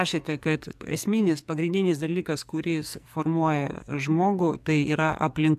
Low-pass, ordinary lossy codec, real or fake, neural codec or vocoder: 14.4 kHz; AAC, 96 kbps; fake; codec, 44.1 kHz, 2.6 kbps, SNAC